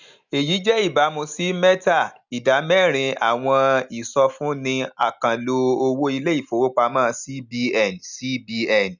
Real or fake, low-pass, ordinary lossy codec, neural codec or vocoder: real; 7.2 kHz; none; none